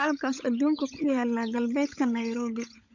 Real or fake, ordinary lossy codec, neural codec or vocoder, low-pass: fake; none; codec, 16 kHz, 16 kbps, FunCodec, trained on LibriTTS, 50 frames a second; 7.2 kHz